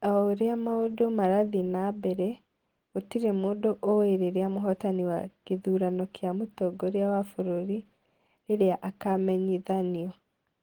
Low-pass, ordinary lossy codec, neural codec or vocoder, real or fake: 19.8 kHz; Opus, 16 kbps; none; real